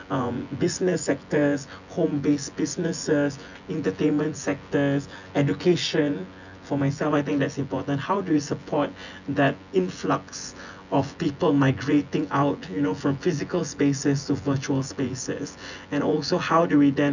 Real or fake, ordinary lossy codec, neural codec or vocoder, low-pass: fake; none; vocoder, 24 kHz, 100 mel bands, Vocos; 7.2 kHz